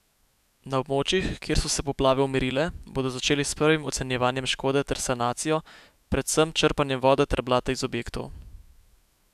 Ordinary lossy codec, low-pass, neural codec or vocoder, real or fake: none; 14.4 kHz; autoencoder, 48 kHz, 128 numbers a frame, DAC-VAE, trained on Japanese speech; fake